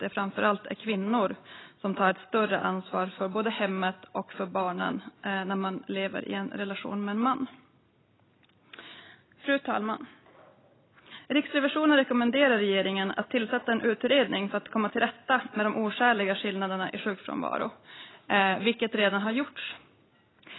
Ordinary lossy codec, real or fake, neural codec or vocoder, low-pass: AAC, 16 kbps; real; none; 7.2 kHz